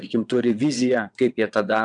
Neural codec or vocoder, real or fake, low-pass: vocoder, 22.05 kHz, 80 mel bands, WaveNeXt; fake; 9.9 kHz